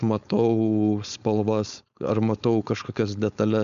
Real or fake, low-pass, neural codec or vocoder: fake; 7.2 kHz; codec, 16 kHz, 4.8 kbps, FACodec